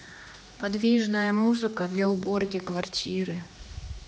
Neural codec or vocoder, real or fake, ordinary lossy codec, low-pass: codec, 16 kHz, 2 kbps, X-Codec, HuBERT features, trained on general audio; fake; none; none